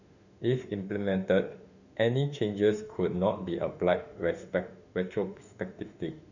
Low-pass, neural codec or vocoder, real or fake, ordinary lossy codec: 7.2 kHz; autoencoder, 48 kHz, 32 numbers a frame, DAC-VAE, trained on Japanese speech; fake; none